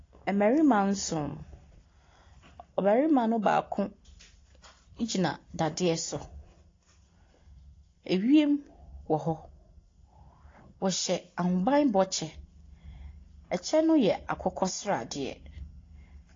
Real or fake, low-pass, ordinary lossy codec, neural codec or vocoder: real; 7.2 kHz; AAC, 32 kbps; none